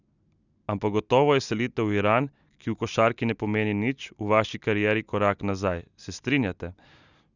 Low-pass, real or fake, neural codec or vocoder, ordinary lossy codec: 7.2 kHz; real; none; none